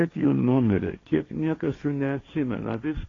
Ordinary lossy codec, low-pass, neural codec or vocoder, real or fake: AAC, 32 kbps; 7.2 kHz; codec, 16 kHz, 1.1 kbps, Voila-Tokenizer; fake